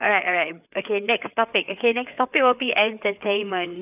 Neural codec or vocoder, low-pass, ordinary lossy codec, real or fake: codec, 16 kHz, 4 kbps, FreqCodec, larger model; 3.6 kHz; none; fake